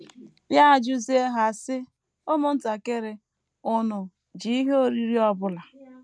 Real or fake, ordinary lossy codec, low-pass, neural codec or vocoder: real; none; none; none